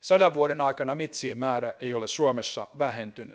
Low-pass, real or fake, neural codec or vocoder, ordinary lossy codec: none; fake; codec, 16 kHz, about 1 kbps, DyCAST, with the encoder's durations; none